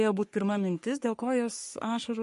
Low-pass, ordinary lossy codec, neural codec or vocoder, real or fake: 14.4 kHz; MP3, 48 kbps; codec, 44.1 kHz, 3.4 kbps, Pupu-Codec; fake